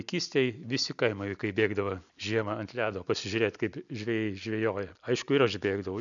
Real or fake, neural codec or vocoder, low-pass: real; none; 7.2 kHz